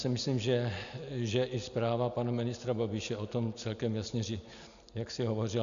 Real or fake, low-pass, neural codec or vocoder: real; 7.2 kHz; none